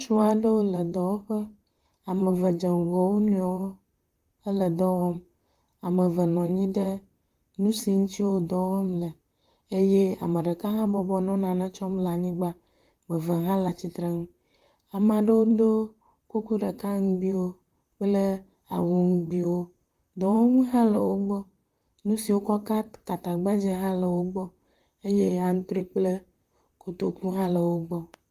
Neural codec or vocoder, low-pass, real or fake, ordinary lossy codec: vocoder, 44.1 kHz, 128 mel bands, Pupu-Vocoder; 14.4 kHz; fake; Opus, 32 kbps